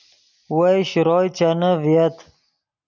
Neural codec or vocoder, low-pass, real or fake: none; 7.2 kHz; real